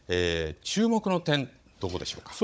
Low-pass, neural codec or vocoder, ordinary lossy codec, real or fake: none; codec, 16 kHz, 16 kbps, FunCodec, trained on Chinese and English, 50 frames a second; none; fake